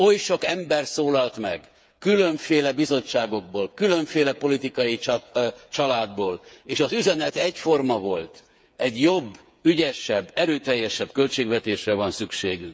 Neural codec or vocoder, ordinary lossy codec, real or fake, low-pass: codec, 16 kHz, 8 kbps, FreqCodec, smaller model; none; fake; none